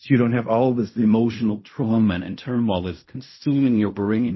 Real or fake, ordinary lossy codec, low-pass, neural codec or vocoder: fake; MP3, 24 kbps; 7.2 kHz; codec, 16 kHz in and 24 kHz out, 0.4 kbps, LongCat-Audio-Codec, fine tuned four codebook decoder